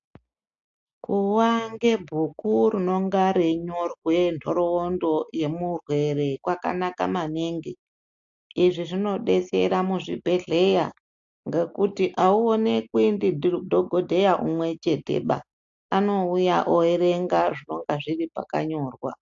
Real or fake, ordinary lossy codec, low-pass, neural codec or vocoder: real; AAC, 64 kbps; 7.2 kHz; none